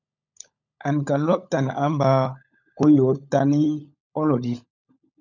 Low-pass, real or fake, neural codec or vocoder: 7.2 kHz; fake; codec, 16 kHz, 16 kbps, FunCodec, trained on LibriTTS, 50 frames a second